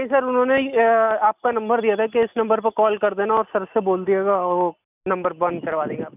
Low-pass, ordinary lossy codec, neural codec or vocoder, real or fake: 3.6 kHz; none; none; real